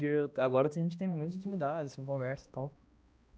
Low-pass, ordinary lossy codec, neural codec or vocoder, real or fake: none; none; codec, 16 kHz, 1 kbps, X-Codec, HuBERT features, trained on balanced general audio; fake